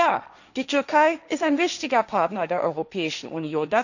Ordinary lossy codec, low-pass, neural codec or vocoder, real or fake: none; 7.2 kHz; codec, 16 kHz, 1.1 kbps, Voila-Tokenizer; fake